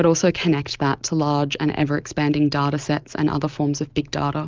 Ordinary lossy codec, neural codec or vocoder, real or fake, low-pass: Opus, 24 kbps; none; real; 7.2 kHz